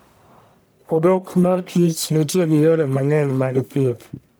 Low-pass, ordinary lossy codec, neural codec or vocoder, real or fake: none; none; codec, 44.1 kHz, 1.7 kbps, Pupu-Codec; fake